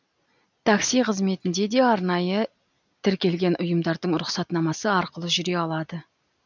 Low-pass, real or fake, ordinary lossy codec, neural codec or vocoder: 7.2 kHz; real; none; none